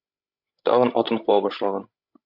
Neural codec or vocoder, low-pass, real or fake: codec, 16 kHz, 16 kbps, FreqCodec, larger model; 5.4 kHz; fake